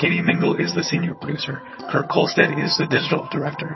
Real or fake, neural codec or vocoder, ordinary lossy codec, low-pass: fake; vocoder, 22.05 kHz, 80 mel bands, HiFi-GAN; MP3, 24 kbps; 7.2 kHz